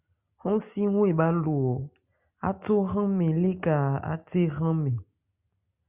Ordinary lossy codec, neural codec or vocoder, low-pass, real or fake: Opus, 64 kbps; none; 3.6 kHz; real